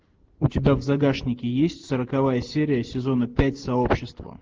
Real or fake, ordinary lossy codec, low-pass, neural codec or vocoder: real; Opus, 16 kbps; 7.2 kHz; none